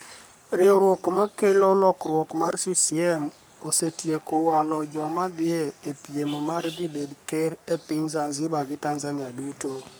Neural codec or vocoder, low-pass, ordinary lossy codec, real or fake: codec, 44.1 kHz, 3.4 kbps, Pupu-Codec; none; none; fake